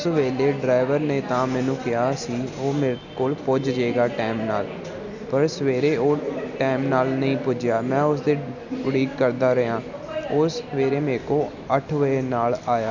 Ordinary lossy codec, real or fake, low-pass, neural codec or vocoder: none; real; 7.2 kHz; none